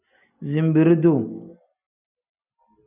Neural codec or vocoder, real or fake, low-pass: none; real; 3.6 kHz